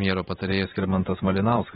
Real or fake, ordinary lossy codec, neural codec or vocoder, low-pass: fake; AAC, 16 kbps; vocoder, 44.1 kHz, 128 mel bands every 512 samples, BigVGAN v2; 19.8 kHz